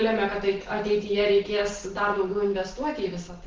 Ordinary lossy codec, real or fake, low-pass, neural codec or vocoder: Opus, 16 kbps; real; 7.2 kHz; none